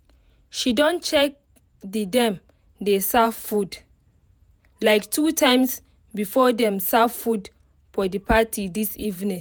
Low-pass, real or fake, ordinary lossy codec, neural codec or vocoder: none; fake; none; vocoder, 48 kHz, 128 mel bands, Vocos